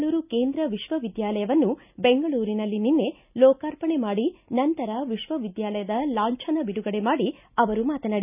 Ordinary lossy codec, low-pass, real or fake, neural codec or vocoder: none; 3.6 kHz; real; none